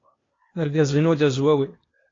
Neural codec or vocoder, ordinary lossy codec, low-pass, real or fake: codec, 16 kHz, 0.8 kbps, ZipCodec; AAC, 32 kbps; 7.2 kHz; fake